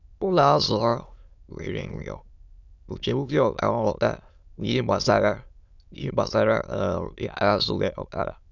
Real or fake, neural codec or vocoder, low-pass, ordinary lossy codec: fake; autoencoder, 22.05 kHz, a latent of 192 numbers a frame, VITS, trained on many speakers; 7.2 kHz; none